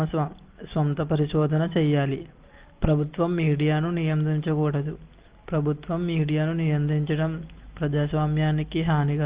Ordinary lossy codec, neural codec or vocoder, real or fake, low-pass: Opus, 16 kbps; none; real; 3.6 kHz